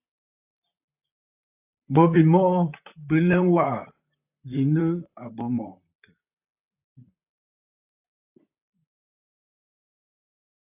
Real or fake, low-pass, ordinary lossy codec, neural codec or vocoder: fake; 3.6 kHz; AAC, 32 kbps; vocoder, 44.1 kHz, 128 mel bands, Pupu-Vocoder